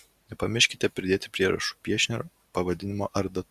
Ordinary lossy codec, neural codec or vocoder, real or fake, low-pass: Opus, 64 kbps; none; real; 14.4 kHz